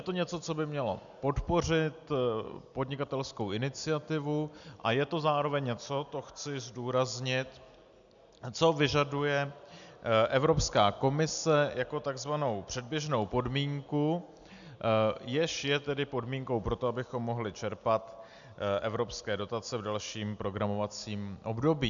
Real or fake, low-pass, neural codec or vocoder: real; 7.2 kHz; none